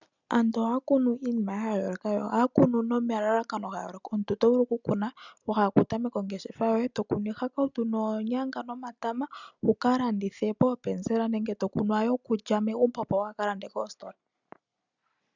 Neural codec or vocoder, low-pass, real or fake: none; 7.2 kHz; real